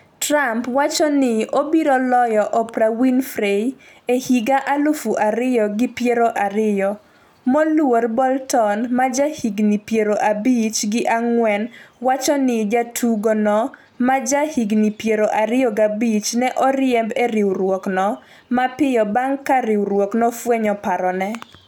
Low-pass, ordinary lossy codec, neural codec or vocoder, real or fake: 19.8 kHz; none; none; real